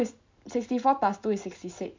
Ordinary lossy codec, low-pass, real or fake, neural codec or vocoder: MP3, 64 kbps; 7.2 kHz; real; none